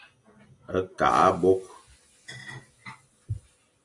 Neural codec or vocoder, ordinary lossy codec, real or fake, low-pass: none; AAC, 64 kbps; real; 10.8 kHz